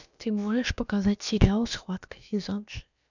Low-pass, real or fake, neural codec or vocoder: 7.2 kHz; fake; codec, 16 kHz, about 1 kbps, DyCAST, with the encoder's durations